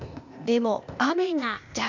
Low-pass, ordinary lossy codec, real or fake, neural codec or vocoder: 7.2 kHz; MP3, 64 kbps; fake; codec, 16 kHz, 0.8 kbps, ZipCodec